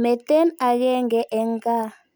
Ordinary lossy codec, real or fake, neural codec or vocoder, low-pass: none; real; none; none